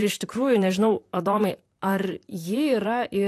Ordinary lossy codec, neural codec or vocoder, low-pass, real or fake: AAC, 64 kbps; vocoder, 44.1 kHz, 128 mel bands, Pupu-Vocoder; 14.4 kHz; fake